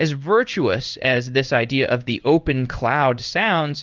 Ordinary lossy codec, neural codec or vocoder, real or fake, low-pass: Opus, 24 kbps; codec, 16 kHz in and 24 kHz out, 1 kbps, XY-Tokenizer; fake; 7.2 kHz